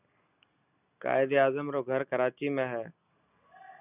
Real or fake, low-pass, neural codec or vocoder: real; 3.6 kHz; none